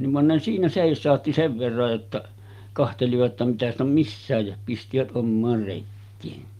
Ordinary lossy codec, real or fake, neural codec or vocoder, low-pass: Opus, 32 kbps; real; none; 14.4 kHz